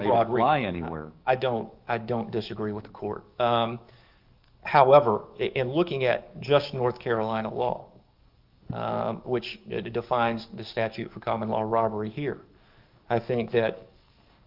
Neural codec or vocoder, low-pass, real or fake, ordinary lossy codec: codec, 44.1 kHz, 7.8 kbps, DAC; 5.4 kHz; fake; Opus, 24 kbps